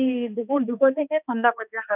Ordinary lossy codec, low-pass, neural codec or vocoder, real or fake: none; 3.6 kHz; codec, 16 kHz, 2 kbps, X-Codec, HuBERT features, trained on balanced general audio; fake